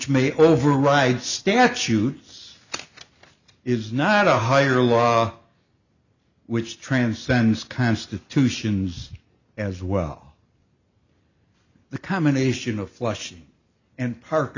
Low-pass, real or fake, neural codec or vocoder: 7.2 kHz; real; none